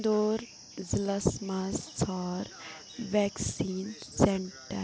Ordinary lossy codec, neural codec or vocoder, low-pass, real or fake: none; none; none; real